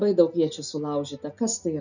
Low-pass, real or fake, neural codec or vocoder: 7.2 kHz; real; none